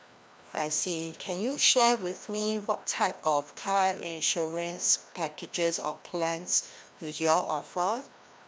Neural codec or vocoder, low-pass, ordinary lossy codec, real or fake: codec, 16 kHz, 1 kbps, FreqCodec, larger model; none; none; fake